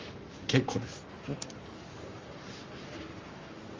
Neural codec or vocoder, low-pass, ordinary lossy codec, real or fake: codec, 44.1 kHz, 7.8 kbps, Pupu-Codec; 7.2 kHz; Opus, 32 kbps; fake